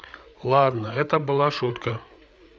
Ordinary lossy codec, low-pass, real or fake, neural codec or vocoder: none; none; fake; codec, 16 kHz, 8 kbps, FreqCodec, larger model